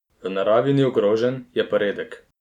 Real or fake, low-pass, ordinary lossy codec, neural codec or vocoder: real; 19.8 kHz; none; none